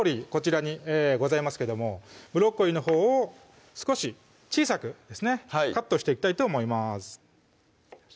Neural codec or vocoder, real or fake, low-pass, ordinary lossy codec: none; real; none; none